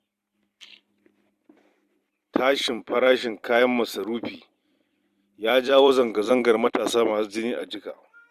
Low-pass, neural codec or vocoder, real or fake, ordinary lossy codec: 14.4 kHz; vocoder, 44.1 kHz, 128 mel bands every 256 samples, BigVGAN v2; fake; Opus, 64 kbps